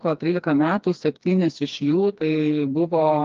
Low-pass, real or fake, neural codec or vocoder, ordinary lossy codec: 7.2 kHz; fake; codec, 16 kHz, 2 kbps, FreqCodec, smaller model; Opus, 32 kbps